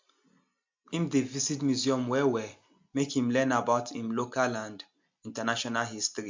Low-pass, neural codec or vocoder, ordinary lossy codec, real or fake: 7.2 kHz; none; MP3, 64 kbps; real